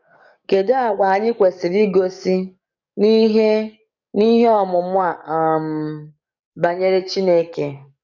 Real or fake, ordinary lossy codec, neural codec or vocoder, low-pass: fake; none; codec, 44.1 kHz, 7.8 kbps, DAC; 7.2 kHz